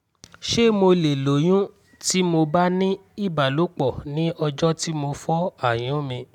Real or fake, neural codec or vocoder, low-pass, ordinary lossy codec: real; none; 19.8 kHz; none